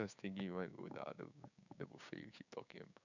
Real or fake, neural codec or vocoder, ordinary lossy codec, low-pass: fake; codec, 16 kHz, 6 kbps, DAC; none; 7.2 kHz